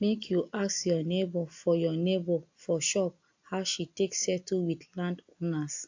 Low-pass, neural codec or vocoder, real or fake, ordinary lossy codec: 7.2 kHz; none; real; AAC, 48 kbps